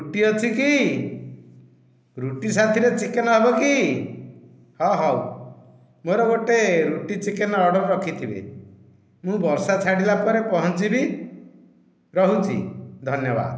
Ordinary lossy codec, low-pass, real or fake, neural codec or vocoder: none; none; real; none